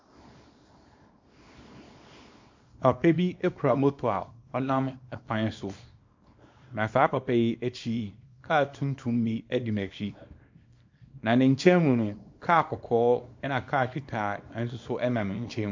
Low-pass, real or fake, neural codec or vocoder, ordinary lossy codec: 7.2 kHz; fake; codec, 24 kHz, 0.9 kbps, WavTokenizer, small release; MP3, 48 kbps